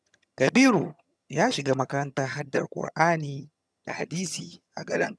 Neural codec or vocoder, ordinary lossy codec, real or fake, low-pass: vocoder, 22.05 kHz, 80 mel bands, HiFi-GAN; none; fake; none